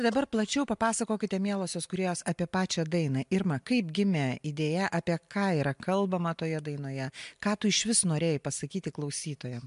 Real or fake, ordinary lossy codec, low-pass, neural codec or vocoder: real; MP3, 64 kbps; 10.8 kHz; none